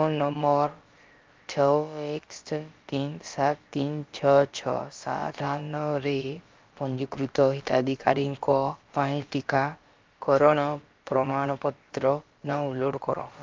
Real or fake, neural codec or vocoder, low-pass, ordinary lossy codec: fake; codec, 16 kHz, about 1 kbps, DyCAST, with the encoder's durations; 7.2 kHz; Opus, 32 kbps